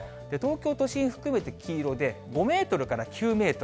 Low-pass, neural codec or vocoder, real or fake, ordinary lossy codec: none; none; real; none